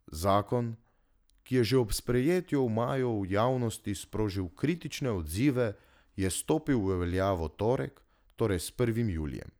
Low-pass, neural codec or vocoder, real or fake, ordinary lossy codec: none; none; real; none